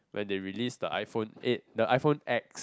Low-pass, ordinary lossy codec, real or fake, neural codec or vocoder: none; none; real; none